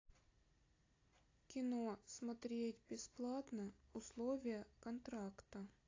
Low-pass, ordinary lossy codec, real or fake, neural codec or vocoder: 7.2 kHz; AAC, 32 kbps; real; none